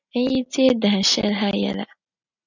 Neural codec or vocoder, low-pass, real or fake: none; 7.2 kHz; real